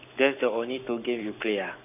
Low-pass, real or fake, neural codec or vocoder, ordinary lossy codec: 3.6 kHz; fake; codec, 44.1 kHz, 7.8 kbps, Pupu-Codec; none